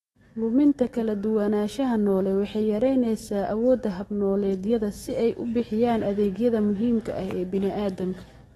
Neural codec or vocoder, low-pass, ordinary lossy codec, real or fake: autoencoder, 48 kHz, 128 numbers a frame, DAC-VAE, trained on Japanese speech; 19.8 kHz; AAC, 32 kbps; fake